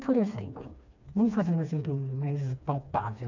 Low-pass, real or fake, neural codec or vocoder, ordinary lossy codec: 7.2 kHz; fake; codec, 16 kHz, 2 kbps, FreqCodec, smaller model; none